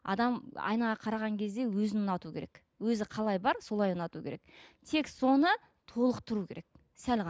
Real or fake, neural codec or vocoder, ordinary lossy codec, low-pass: real; none; none; none